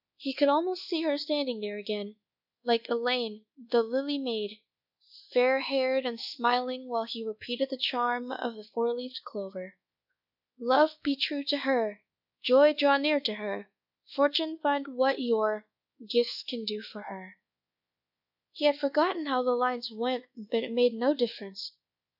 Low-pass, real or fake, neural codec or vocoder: 5.4 kHz; fake; codec, 24 kHz, 1.2 kbps, DualCodec